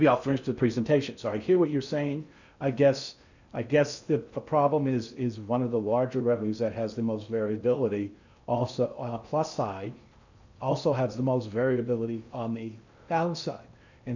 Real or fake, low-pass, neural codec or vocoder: fake; 7.2 kHz; codec, 16 kHz in and 24 kHz out, 0.6 kbps, FocalCodec, streaming, 4096 codes